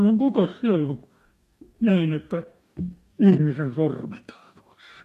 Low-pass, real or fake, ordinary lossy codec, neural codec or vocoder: 14.4 kHz; fake; MP3, 64 kbps; codec, 44.1 kHz, 2.6 kbps, DAC